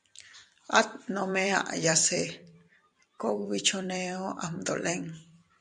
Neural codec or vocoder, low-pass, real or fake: vocoder, 44.1 kHz, 128 mel bands every 256 samples, BigVGAN v2; 10.8 kHz; fake